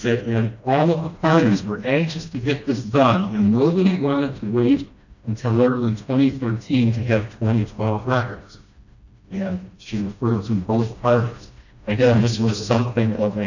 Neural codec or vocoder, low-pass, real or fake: codec, 16 kHz, 1 kbps, FreqCodec, smaller model; 7.2 kHz; fake